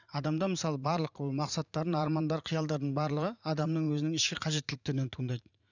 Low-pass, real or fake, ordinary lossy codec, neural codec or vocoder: 7.2 kHz; fake; none; vocoder, 44.1 kHz, 80 mel bands, Vocos